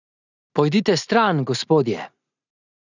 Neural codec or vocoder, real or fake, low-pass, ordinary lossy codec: none; real; 7.2 kHz; none